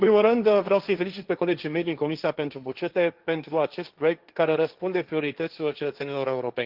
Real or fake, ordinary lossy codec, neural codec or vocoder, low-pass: fake; Opus, 32 kbps; codec, 16 kHz, 1.1 kbps, Voila-Tokenizer; 5.4 kHz